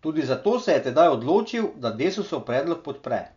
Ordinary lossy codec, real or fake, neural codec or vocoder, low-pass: none; real; none; 7.2 kHz